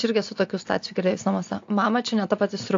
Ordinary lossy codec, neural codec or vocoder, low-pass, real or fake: AAC, 48 kbps; none; 7.2 kHz; real